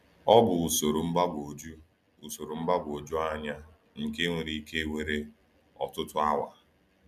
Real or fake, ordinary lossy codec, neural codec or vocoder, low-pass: real; none; none; 14.4 kHz